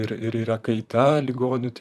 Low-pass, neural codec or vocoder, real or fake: 14.4 kHz; vocoder, 44.1 kHz, 128 mel bands, Pupu-Vocoder; fake